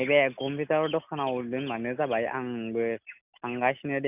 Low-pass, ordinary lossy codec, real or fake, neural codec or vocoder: 3.6 kHz; none; real; none